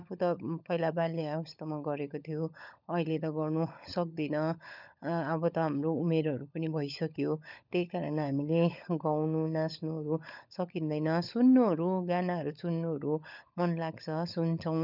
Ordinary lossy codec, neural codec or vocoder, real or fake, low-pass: none; codec, 16 kHz, 16 kbps, FunCodec, trained on LibriTTS, 50 frames a second; fake; 5.4 kHz